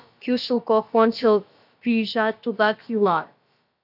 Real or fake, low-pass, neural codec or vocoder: fake; 5.4 kHz; codec, 16 kHz, about 1 kbps, DyCAST, with the encoder's durations